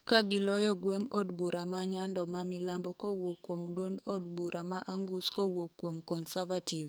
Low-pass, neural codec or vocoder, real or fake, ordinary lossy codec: none; codec, 44.1 kHz, 2.6 kbps, SNAC; fake; none